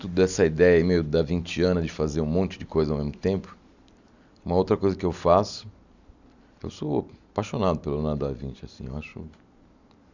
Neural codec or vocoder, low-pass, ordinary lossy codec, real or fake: none; 7.2 kHz; none; real